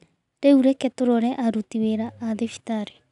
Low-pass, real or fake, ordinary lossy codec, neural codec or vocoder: 10.8 kHz; real; none; none